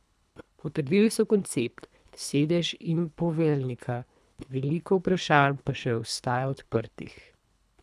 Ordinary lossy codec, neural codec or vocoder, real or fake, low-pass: none; codec, 24 kHz, 3 kbps, HILCodec; fake; none